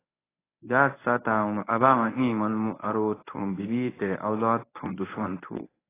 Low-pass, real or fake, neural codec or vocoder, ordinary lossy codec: 3.6 kHz; fake; codec, 24 kHz, 0.9 kbps, WavTokenizer, medium speech release version 1; AAC, 16 kbps